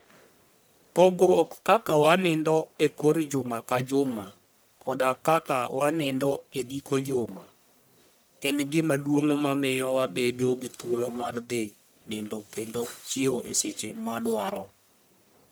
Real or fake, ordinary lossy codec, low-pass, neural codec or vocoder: fake; none; none; codec, 44.1 kHz, 1.7 kbps, Pupu-Codec